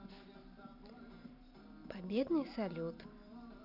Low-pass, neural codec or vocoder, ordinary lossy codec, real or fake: 5.4 kHz; none; none; real